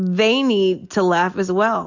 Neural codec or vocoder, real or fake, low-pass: none; real; 7.2 kHz